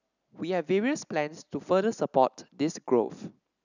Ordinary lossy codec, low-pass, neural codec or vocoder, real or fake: none; 7.2 kHz; none; real